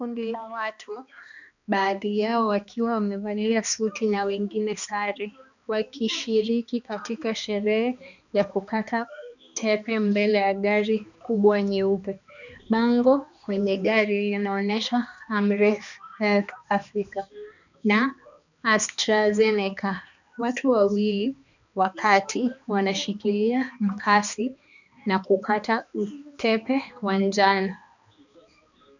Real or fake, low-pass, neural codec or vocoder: fake; 7.2 kHz; codec, 16 kHz, 2 kbps, X-Codec, HuBERT features, trained on balanced general audio